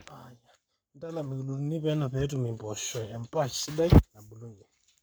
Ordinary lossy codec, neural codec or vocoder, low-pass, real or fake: none; codec, 44.1 kHz, 7.8 kbps, Pupu-Codec; none; fake